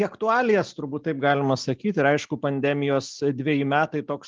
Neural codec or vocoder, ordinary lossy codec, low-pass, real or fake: none; Opus, 32 kbps; 7.2 kHz; real